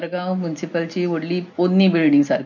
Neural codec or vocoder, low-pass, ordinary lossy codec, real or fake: none; 7.2 kHz; none; real